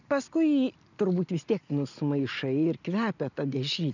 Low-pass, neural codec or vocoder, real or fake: 7.2 kHz; none; real